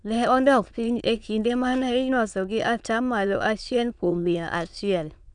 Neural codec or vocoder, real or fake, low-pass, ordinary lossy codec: autoencoder, 22.05 kHz, a latent of 192 numbers a frame, VITS, trained on many speakers; fake; 9.9 kHz; none